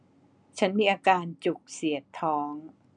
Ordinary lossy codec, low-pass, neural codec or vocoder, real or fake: none; 9.9 kHz; none; real